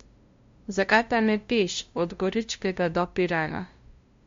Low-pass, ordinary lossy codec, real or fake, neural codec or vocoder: 7.2 kHz; MP3, 48 kbps; fake; codec, 16 kHz, 0.5 kbps, FunCodec, trained on LibriTTS, 25 frames a second